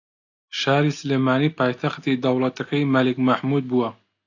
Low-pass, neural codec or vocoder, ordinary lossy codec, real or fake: 7.2 kHz; none; AAC, 48 kbps; real